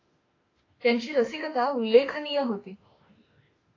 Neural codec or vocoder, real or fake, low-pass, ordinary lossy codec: autoencoder, 48 kHz, 32 numbers a frame, DAC-VAE, trained on Japanese speech; fake; 7.2 kHz; AAC, 32 kbps